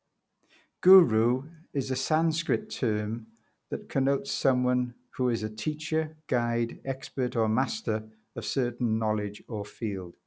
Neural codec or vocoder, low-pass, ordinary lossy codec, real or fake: none; none; none; real